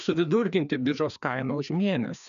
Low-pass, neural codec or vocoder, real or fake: 7.2 kHz; codec, 16 kHz, 2 kbps, FreqCodec, larger model; fake